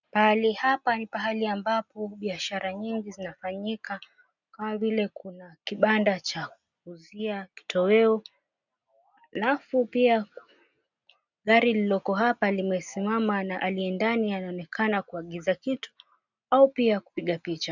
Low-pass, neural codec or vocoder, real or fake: 7.2 kHz; none; real